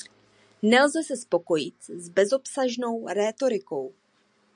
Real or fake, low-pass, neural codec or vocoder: real; 9.9 kHz; none